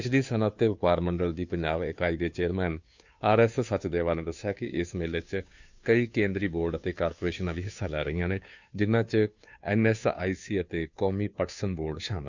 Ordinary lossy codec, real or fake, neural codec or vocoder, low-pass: Opus, 64 kbps; fake; codec, 16 kHz, 2 kbps, FunCodec, trained on Chinese and English, 25 frames a second; 7.2 kHz